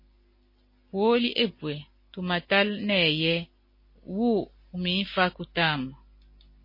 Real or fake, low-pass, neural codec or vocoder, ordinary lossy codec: real; 5.4 kHz; none; MP3, 24 kbps